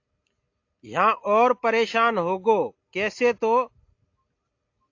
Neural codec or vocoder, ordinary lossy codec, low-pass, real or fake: none; AAC, 48 kbps; 7.2 kHz; real